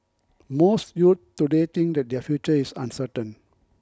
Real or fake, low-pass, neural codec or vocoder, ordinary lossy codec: real; none; none; none